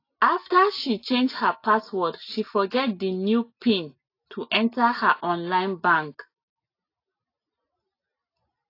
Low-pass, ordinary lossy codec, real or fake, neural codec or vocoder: 5.4 kHz; AAC, 32 kbps; real; none